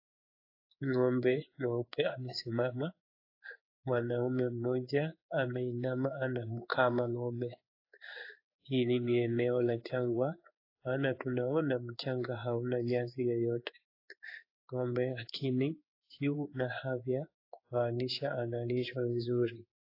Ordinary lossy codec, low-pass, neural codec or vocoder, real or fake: AAC, 32 kbps; 5.4 kHz; codec, 16 kHz in and 24 kHz out, 1 kbps, XY-Tokenizer; fake